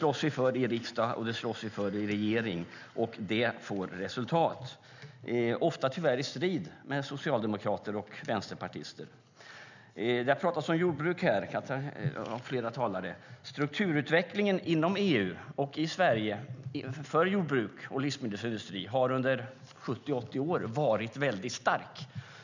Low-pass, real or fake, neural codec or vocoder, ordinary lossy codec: 7.2 kHz; real; none; none